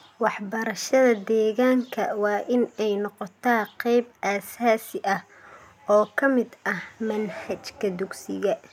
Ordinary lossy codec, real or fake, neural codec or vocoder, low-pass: none; real; none; 19.8 kHz